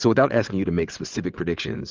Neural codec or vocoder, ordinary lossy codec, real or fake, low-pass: vocoder, 22.05 kHz, 80 mel bands, WaveNeXt; Opus, 24 kbps; fake; 7.2 kHz